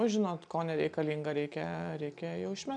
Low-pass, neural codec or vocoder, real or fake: 9.9 kHz; none; real